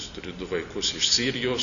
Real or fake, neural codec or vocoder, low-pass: real; none; 7.2 kHz